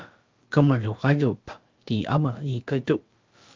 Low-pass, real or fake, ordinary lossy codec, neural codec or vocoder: 7.2 kHz; fake; Opus, 24 kbps; codec, 16 kHz, about 1 kbps, DyCAST, with the encoder's durations